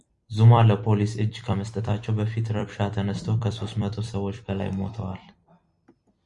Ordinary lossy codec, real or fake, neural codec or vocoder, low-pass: Opus, 64 kbps; fake; vocoder, 44.1 kHz, 128 mel bands every 256 samples, BigVGAN v2; 10.8 kHz